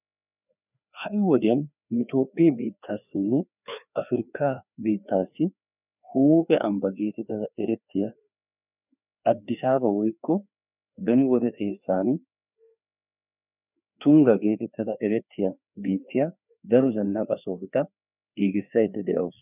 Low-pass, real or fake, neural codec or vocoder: 3.6 kHz; fake; codec, 16 kHz, 2 kbps, FreqCodec, larger model